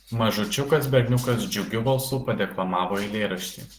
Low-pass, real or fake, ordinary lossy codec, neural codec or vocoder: 14.4 kHz; real; Opus, 16 kbps; none